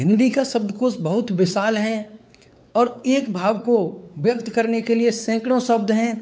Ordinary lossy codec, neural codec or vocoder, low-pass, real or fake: none; codec, 16 kHz, 4 kbps, X-Codec, WavLM features, trained on Multilingual LibriSpeech; none; fake